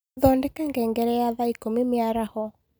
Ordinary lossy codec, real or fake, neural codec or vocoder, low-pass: none; real; none; none